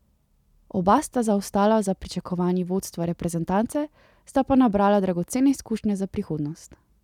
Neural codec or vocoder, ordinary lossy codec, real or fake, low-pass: none; none; real; 19.8 kHz